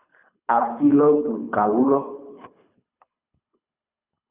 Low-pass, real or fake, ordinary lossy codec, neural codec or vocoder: 3.6 kHz; fake; Opus, 64 kbps; codec, 24 kHz, 3 kbps, HILCodec